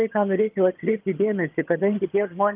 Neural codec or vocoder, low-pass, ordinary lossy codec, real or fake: vocoder, 22.05 kHz, 80 mel bands, HiFi-GAN; 3.6 kHz; Opus, 32 kbps; fake